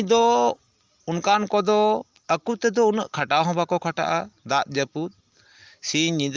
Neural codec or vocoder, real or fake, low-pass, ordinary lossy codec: none; real; 7.2 kHz; Opus, 32 kbps